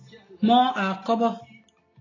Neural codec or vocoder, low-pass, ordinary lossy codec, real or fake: none; 7.2 kHz; AAC, 32 kbps; real